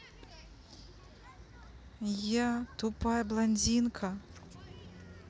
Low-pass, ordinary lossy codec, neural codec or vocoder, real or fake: none; none; none; real